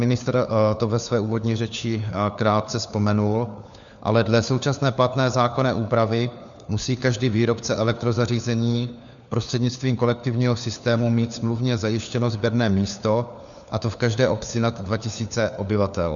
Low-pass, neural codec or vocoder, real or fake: 7.2 kHz; codec, 16 kHz, 4 kbps, FunCodec, trained on LibriTTS, 50 frames a second; fake